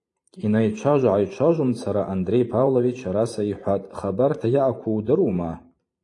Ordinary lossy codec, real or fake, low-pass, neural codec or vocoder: AAC, 48 kbps; fake; 10.8 kHz; vocoder, 44.1 kHz, 128 mel bands every 512 samples, BigVGAN v2